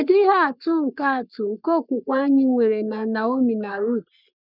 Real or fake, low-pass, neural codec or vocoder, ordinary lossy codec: fake; 5.4 kHz; codec, 44.1 kHz, 3.4 kbps, Pupu-Codec; none